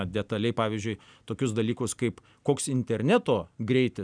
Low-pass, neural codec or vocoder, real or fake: 9.9 kHz; none; real